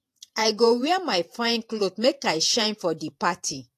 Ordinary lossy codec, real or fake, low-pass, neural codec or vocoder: AAC, 64 kbps; fake; 14.4 kHz; vocoder, 48 kHz, 128 mel bands, Vocos